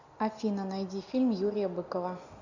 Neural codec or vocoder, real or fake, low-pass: none; real; 7.2 kHz